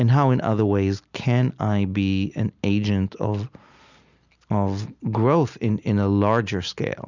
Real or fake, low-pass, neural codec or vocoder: real; 7.2 kHz; none